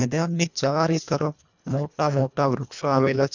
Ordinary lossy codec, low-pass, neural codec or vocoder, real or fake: none; 7.2 kHz; codec, 24 kHz, 1.5 kbps, HILCodec; fake